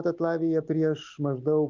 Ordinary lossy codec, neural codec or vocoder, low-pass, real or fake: Opus, 32 kbps; none; 7.2 kHz; real